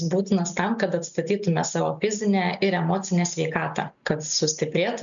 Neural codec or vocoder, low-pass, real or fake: none; 7.2 kHz; real